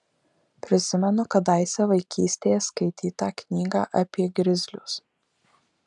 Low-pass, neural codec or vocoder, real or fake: 10.8 kHz; none; real